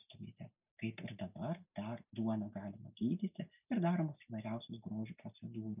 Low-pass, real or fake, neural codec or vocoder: 3.6 kHz; real; none